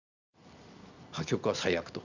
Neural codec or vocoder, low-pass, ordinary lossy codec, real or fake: none; 7.2 kHz; none; real